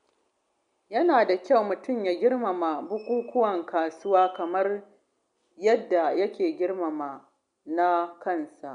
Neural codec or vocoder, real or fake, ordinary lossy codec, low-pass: none; real; MP3, 64 kbps; 9.9 kHz